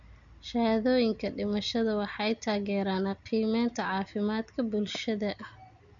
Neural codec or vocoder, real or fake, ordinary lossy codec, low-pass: none; real; none; 7.2 kHz